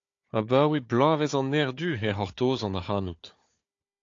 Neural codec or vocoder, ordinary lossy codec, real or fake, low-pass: codec, 16 kHz, 4 kbps, FunCodec, trained on Chinese and English, 50 frames a second; AAC, 48 kbps; fake; 7.2 kHz